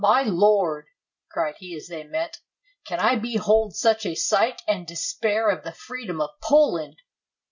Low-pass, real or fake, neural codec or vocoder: 7.2 kHz; real; none